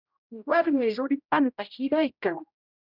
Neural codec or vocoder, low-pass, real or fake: codec, 16 kHz, 0.5 kbps, X-Codec, HuBERT features, trained on general audio; 5.4 kHz; fake